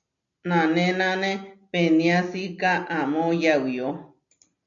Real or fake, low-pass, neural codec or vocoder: real; 7.2 kHz; none